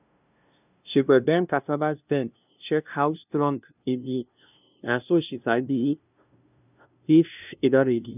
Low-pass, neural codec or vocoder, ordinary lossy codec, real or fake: 3.6 kHz; codec, 16 kHz, 0.5 kbps, FunCodec, trained on LibriTTS, 25 frames a second; none; fake